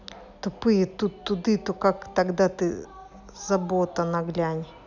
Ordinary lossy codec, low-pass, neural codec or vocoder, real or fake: none; 7.2 kHz; none; real